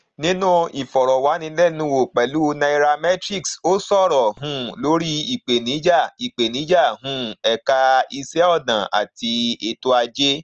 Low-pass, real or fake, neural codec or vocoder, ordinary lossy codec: 7.2 kHz; real; none; Opus, 24 kbps